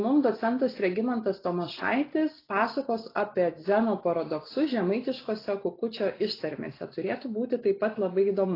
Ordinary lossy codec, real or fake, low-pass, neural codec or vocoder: AAC, 24 kbps; real; 5.4 kHz; none